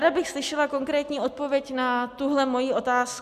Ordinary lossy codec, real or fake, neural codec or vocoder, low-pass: MP3, 96 kbps; real; none; 14.4 kHz